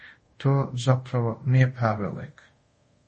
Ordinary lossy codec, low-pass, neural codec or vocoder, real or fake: MP3, 32 kbps; 10.8 kHz; codec, 24 kHz, 0.5 kbps, DualCodec; fake